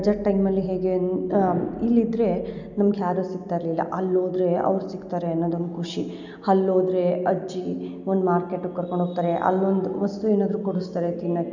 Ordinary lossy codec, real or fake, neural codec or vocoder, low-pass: none; real; none; 7.2 kHz